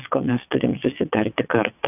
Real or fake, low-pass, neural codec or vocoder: fake; 3.6 kHz; vocoder, 22.05 kHz, 80 mel bands, WaveNeXt